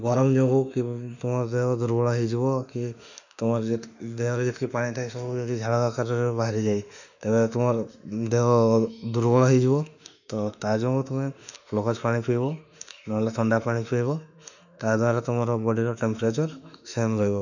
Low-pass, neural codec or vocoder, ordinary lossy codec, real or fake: 7.2 kHz; autoencoder, 48 kHz, 32 numbers a frame, DAC-VAE, trained on Japanese speech; none; fake